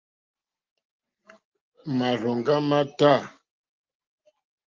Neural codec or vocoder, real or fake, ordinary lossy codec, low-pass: none; real; Opus, 32 kbps; 7.2 kHz